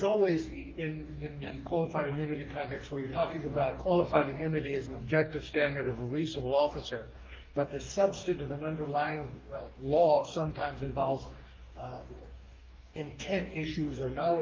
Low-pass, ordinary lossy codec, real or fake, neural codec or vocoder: 7.2 kHz; Opus, 24 kbps; fake; codec, 44.1 kHz, 2.6 kbps, DAC